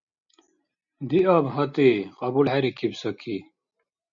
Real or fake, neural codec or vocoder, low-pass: real; none; 7.2 kHz